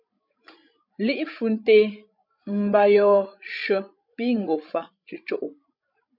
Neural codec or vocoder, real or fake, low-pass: codec, 16 kHz, 16 kbps, FreqCodec, larger model; fake; 5.4 kHz